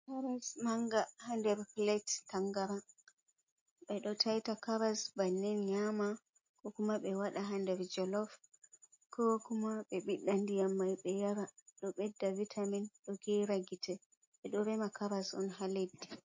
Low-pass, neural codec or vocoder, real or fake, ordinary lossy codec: 7.2 kHz; none; real; MP3, 32 kbps